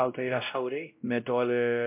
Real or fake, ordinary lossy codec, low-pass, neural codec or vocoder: fake; MP3, 32 kbps; 3.6 kHz; codec, 16 kHz, 0.5 kbps, X-Codec, WavLM features, trained on Multilingual LibriSpeech